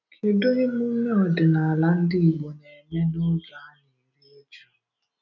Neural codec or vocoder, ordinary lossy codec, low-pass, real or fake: none; none; 7.2 kHz; real